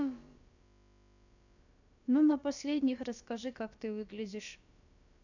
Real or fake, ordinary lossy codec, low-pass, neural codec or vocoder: fake; none; 7.2 kHz; codec, 16 kHz, about 1 kbps, DyCAST, with the encoder's durations